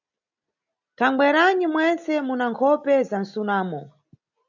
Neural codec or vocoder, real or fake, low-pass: none; real; 7.2 kHz